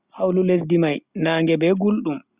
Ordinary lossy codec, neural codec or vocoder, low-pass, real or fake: Opus, 64 kbps; none; 3.6 kHz; real